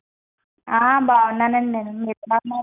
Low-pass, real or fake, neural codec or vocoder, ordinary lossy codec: 3.6 kHz; real; none; none